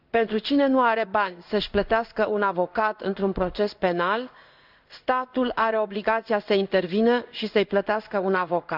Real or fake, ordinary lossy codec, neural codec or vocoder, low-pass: fake; none; codec, 16 kHz in and 24 kHz out, 1 kbps, XY-Tokenizer; 5.4 kHz